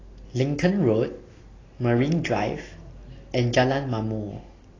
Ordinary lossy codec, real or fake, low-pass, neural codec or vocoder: AAC, 32 kbps; real; 7.2 kHz; none